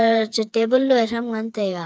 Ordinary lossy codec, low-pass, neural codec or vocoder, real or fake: none; none; codec, 16 kHz, 4 kbps, FreqCodec, smaller model; fake